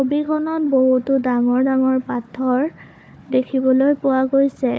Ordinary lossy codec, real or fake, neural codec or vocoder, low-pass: none; fake; codec, 16 kHz, 4 kbps, FunCodec, trained on Chinese and English, 50 frames a second; none